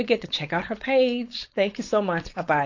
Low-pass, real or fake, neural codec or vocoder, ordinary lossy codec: 7.2 kHz; fake; codec, 16 kHz, 4.8 kbps, FACodec; AAC, 48 kbps